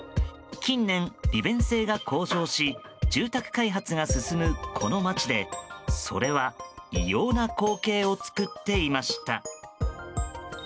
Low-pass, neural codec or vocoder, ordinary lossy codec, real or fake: none; none; none; real